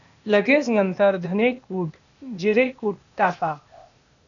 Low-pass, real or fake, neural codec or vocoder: 7.2 kHz; fake; codec, 16 kHz, 0.8 kbps, ZipCodec